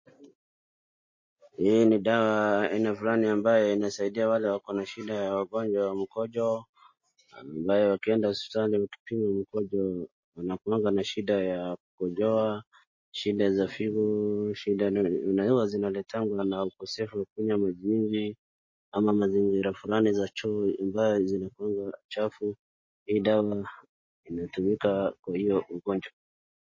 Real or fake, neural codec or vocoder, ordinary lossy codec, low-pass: real; none; MP3, 32 kbps; 7.2 kHz